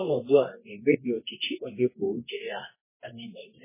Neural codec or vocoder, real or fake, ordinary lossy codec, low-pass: codec, 24 kHz, 0.9 kbps, WavTokenizer, medium music audio release; fake; MP3, 16 kbps; 3.6 kHz